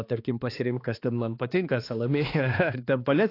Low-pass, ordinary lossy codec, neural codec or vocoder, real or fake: 5.4 kHz; AAC, 32 kbps; codec, 16 kHz, 2 kbps, X-Codec, HuBERT features, trained on balanced general audio; fake